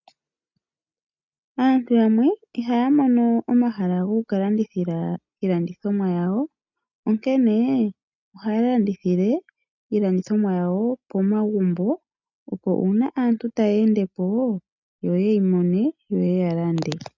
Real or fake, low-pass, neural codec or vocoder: real; 7.2 kHz; none